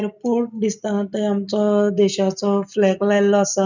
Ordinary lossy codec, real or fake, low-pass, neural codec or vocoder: none; real; 7.2 kHz; none